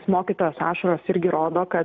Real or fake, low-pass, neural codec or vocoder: real; 7.2 kHz; none